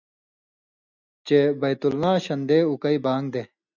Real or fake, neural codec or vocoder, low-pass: real; none; 7.2 kHz